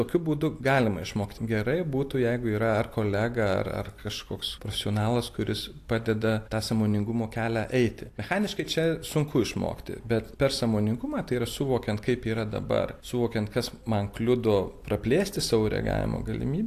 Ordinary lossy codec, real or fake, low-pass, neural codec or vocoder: AAC, 64 kbps; real; 14.4 kHz; none